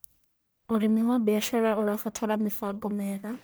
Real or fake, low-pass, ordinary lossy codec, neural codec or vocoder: fake; none; none; codec, 44.1 kHz, 1.7 kbps, Pupu-Codec